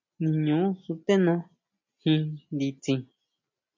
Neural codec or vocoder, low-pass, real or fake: none; 7.2 kHz; real